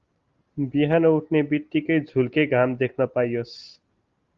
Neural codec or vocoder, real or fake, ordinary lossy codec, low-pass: none; real; Opus, 32 kbps; 7.2 kHz